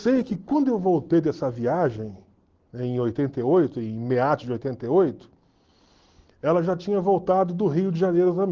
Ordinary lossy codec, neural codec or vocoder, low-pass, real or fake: Opus, 16 kbps; none; 7.2 kHz; real